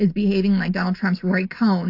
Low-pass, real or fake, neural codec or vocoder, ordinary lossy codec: 5.4 kHz; real; none; AAC, 32 kbps